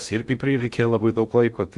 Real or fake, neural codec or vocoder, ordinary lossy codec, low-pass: fake; codec, 16 kHz in and 24 kHz out, 0.6 kbps, FocalCodec, streaming, 2048 codes; Opus, 64 kbps; 10.8 kHz